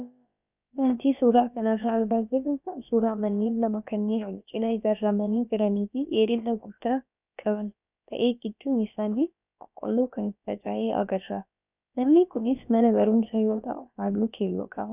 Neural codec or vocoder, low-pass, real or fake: codec, 16 kHz, about 1 kbps, DyCAST, with the encoder's durations; 3.6 kHz; fake